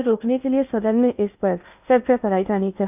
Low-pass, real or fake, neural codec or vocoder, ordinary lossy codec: 3.6 kHz; fake; codec, 16 kHz in and 24 kHz out, 0.6 kbps, FocalCodec, streaming, 2048 codes; none